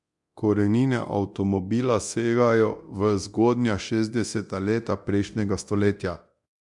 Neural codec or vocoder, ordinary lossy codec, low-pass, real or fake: codec, 24 kHz, 0.9 kbps, DualCodec; MP3, 64 kbps; 10.8 kHz; fake